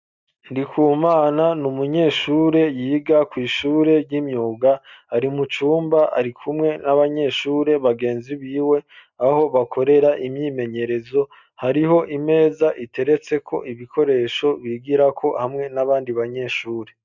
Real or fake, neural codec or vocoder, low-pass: real; none; 7.2 kHz